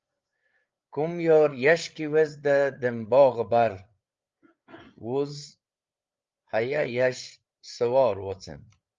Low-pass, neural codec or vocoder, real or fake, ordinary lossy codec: 7.2 kHz; codec, 16 kHz, 8 kbps, FreqCodec, larger model; fake; Opus, 32 kbps